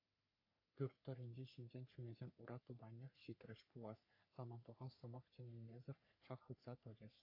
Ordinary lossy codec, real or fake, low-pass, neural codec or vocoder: AAC, 32 kbps; fake; 5.4 kHz; codec, 44.1 kHz, 3.4 kbps, Pupu-Codec